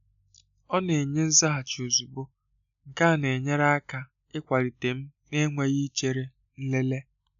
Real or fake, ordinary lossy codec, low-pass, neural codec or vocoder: real; none; 7.2 kHz; none